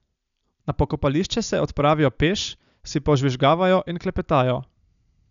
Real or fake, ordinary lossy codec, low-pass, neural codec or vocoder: real; none; 7.2 kHz; none